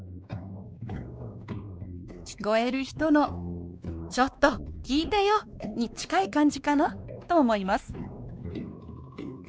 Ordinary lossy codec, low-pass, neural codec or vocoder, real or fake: none; none; codec, 16 kHz, 2 kbps, X-Codec, WavLM features, trained on Multilingual LibriSpeech; fake